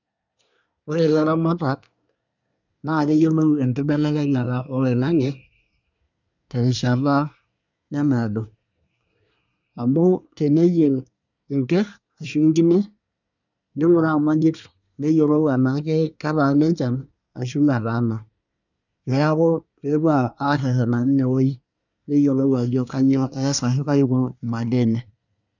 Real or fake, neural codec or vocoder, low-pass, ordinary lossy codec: fake; codec, 24 kHz, 1 kbps, SNAC; 7.2 kHz; none